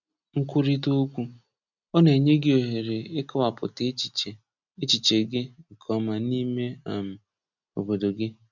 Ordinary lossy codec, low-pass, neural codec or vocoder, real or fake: none; 7.2 kHz; none; real